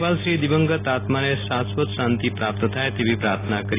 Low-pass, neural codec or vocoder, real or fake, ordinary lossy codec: 3.6 kHz; none; real; AAC, 16 kbps